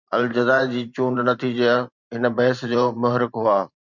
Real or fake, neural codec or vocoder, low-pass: fake; vocoder, 44.1 kHz, 128 mel bands every 512 samples, BigVGAN v2; 7.2 kHz